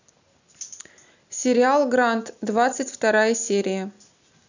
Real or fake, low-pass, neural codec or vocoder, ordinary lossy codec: real; 7.2 kHz; none; none